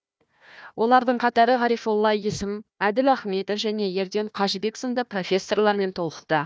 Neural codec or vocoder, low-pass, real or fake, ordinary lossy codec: codec, 16 kHz, 1 kbps, FunCodec, trained on Chinese and English, 50 frames a second; none; fake; none